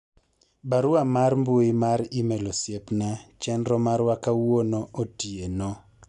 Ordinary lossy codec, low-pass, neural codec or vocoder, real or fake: none; 10.8 kHz; none; real